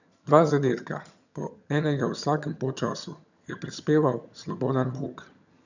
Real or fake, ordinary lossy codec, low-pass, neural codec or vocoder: fake; none; 7.2 kHz; vocoder, 22.05 kHz, 80 mel bands, HiFi-GAN